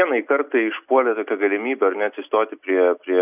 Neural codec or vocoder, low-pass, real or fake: none; 3.6 kHz; real